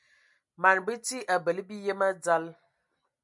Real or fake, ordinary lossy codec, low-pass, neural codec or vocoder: real; MP3, 96 kbps; 10.8 kHz; none